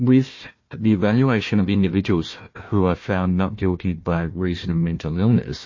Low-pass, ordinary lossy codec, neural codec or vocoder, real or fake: 7.2 kHz; MP3, 32 kbps; codec, 16 kHz, 1 kbps, FunCodec, trained on Chinese and English, 50 frames a second; fake